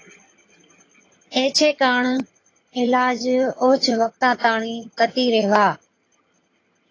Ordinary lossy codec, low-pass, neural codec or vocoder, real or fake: AAC, 32 kbps; 7.2 kHz; vocoder, 22.05 kHz, 80 mel bands, HiFi-GAN; fake